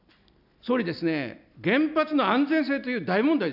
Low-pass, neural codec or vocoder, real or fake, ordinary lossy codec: 5.4 kHz; none; real; none